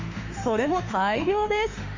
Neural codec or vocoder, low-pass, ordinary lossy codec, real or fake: autoencoder, 48 kHz, 32 numbers a frame, DAC-VAE, trained on Japanese speech; 7.2 kHz; AAC, 48 kbps; fake